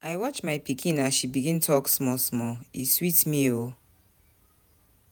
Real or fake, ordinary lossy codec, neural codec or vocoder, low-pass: fake; none; vocoder, 48 kHz, 128 mel bands, Vocos; none